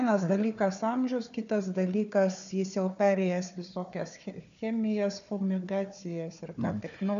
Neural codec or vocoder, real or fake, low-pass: codec, 16 kHz, 4 kbps, FunCodec, trained on Chinese and English, 50 frames a second; fake; 7.2 kHz